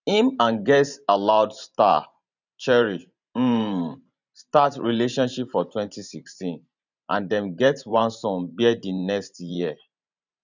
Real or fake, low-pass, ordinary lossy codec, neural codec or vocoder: fake; 7.2 kHz; none; vocoder, 44.1 kHz, 128 mel bands every 512 samples, BigVGAN v2